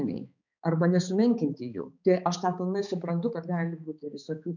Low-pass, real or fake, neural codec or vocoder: 7.2 kHz; fake; codec, 16 kHz, 4 kbps, X-Codec, HuBERT features, trained on balanced general audio